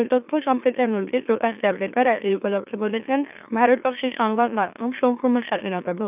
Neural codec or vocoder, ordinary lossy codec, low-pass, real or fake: autoencoder, 44.1 kHz, a latent of 192 numbers a frame, MeloTTS; none; 3.6 kHz; fake